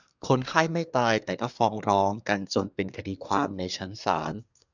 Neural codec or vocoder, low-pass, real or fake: codec, 24 kHz, 1 kbps, SNAC; 7.2 kHz; fake